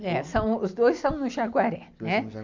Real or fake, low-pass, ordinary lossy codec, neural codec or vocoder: real; 7.2 kHz; MP3, 64 kbps; none